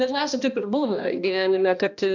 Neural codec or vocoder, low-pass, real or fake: codec, 16 kHz, 1 kbps, X-Codec, HuBERT features, trained on balanced general audio; 7.2 kHz; fake